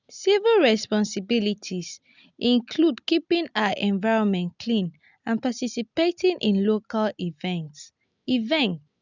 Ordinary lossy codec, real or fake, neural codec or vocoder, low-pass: none; real; none; 7.2 kHz